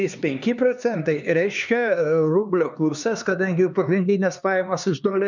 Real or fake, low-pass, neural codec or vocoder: fake; 7.2 kHz; codec, 16 kHz, 2 kbps, X-Codec, HuBERT features, trained on LibriSpeech